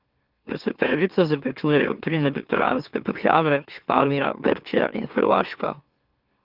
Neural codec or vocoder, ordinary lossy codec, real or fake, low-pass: autoencoder, 44.1 kHz, a latent of 192 numbers a frame, MeloTTS; Opus, 24 kbps; fake; 5.4 kHz